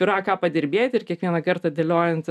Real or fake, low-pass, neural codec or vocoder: real; 14.4 kHz; none